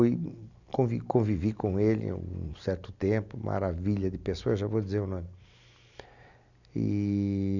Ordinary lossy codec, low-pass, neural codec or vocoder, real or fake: none; 7.2 kHz; none; real